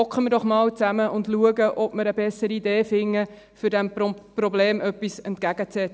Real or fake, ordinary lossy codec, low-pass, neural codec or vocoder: real; none; none; none